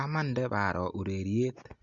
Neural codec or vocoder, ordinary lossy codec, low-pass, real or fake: none; Opus, 64 kbps; 7.2 kHz; real